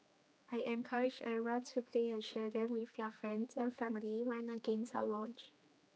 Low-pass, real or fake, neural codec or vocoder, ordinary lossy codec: none; fake; codec, 16 kHz, 2 kbps, X-Codec, HuBERT features, trained on general audio; none